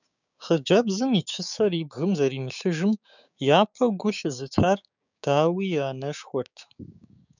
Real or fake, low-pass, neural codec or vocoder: fake; 7.2 kHz; codec, 16 kHz, 6 kbps, DAC